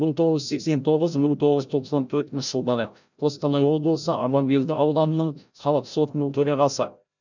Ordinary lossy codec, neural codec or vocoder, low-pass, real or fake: none; codec, 16 kHz, 0.5 kbps, FreqCodec, larger model; 7.2 kHz; fake